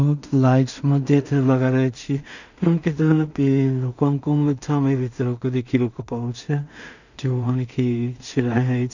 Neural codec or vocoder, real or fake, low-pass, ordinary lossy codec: codec, 16 kHz in and 24 kHz out, 0.4 kbps, LongCat-Audio-Codec, two codebook decoder; fake; 7.2 kHz; none